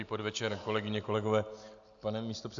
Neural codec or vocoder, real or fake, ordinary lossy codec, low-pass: none; real; AAC, 64 kbps; 7.2 kHz